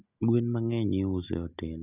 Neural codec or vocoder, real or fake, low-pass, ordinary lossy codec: none; real; 3.6 kHz; none